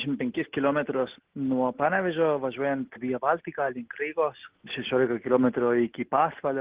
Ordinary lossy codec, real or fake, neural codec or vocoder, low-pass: Opus, 16 kbps; real; none; 3.6 kHz